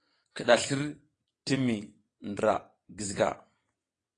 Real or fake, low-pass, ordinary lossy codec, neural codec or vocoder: fake; 9.9 kHz; AAC, 32 kbps; vocoder, 22.05 kHz, 80 mel bands, WaveNeXt